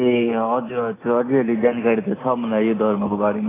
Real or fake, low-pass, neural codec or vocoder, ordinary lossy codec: real; 3.6 kHz; none; AAC, 16 kbps